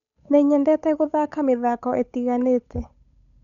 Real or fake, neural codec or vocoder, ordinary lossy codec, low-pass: fake; codec, 16 kHz, 8 kbps, FunCodec, trained on Chinese and English, 25 frames a second; none; 7.2 kHz